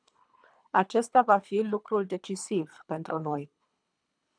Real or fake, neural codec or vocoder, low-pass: fake; codec, 24 kHz, 3 kbps, HILCodec; 9.9 kHz